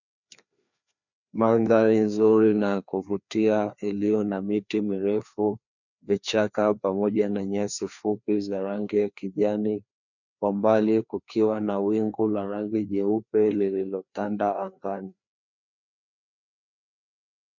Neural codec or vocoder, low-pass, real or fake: codec, 16 kHz, 2 kbps, FreqCodec, larger model; 7.2 kHz; fake